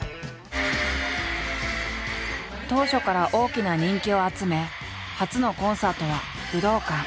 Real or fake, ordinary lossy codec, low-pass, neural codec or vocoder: real; none; none; none